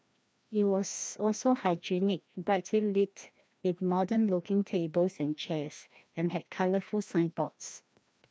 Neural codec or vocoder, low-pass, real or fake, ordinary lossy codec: codec, 16 kHz, 1 kbps, FreqCodec, larger model; none; fake; none